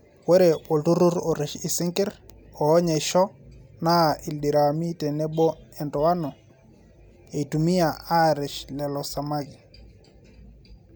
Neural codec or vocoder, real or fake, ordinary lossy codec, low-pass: none; real; none; none